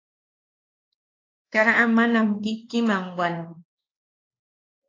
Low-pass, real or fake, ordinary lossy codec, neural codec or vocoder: 7.2 kHz; fake; AAC, 32 kbps; codec, 16 kHz, 2 kbps, X-Codec, WavLM features, trained on Multilingual LibriSpeech